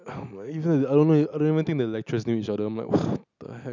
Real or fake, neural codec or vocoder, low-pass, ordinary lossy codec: real; none; 7.2 kHz; none